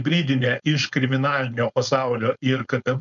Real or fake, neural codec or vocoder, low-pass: fake; codec, 16 kHz, 4.8 kbps, FACodec; 7.2 kHz